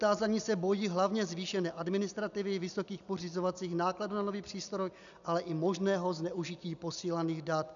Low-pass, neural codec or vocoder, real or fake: 7.2 kHz; none; real